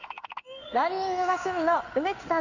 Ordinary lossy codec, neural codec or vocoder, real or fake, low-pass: none; codec, 16 kHz in and 24 kHz out, 1 kbps, XY-Tokenizer; fake; 7.2 kHz